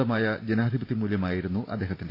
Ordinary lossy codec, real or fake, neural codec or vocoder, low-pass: AAC, 32 kbps; fake; vocoder, 44.1 kHz, 128 mel bands every 512 samples, BigVGAN v2; 5.4 kHz